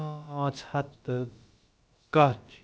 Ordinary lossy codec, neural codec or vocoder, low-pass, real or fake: none; codec, 16 kHz, about 1 kbps, DyCAST, with the encoder's durations; none; fake